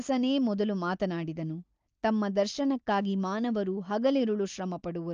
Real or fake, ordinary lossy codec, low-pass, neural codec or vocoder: real; Opus, 24 kbps; 7.2 kHz; none